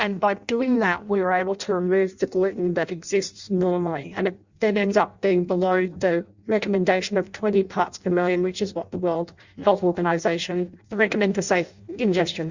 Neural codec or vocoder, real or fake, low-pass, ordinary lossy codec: codec, 16 kHz in and 24 kHz out, 0.6 kbps, FireRedTTS-2 codec; fake; 7.2 kHz; Opus, 64 kbps